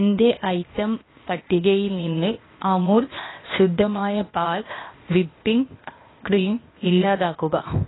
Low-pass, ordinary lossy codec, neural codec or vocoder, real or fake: 7.2 kHz; AAC, 16 kbps; codec, 16 kHz, 0.8 kbps, ZipCodec; fake